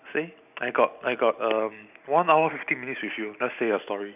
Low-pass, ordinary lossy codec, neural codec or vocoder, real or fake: 3.6 kHz; none; none; real